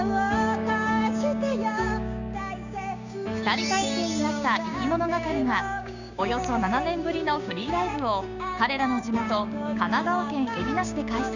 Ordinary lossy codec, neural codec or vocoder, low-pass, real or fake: none; codec, 16 kHz, 6 kbps, DAC; 7.2 kHz; fake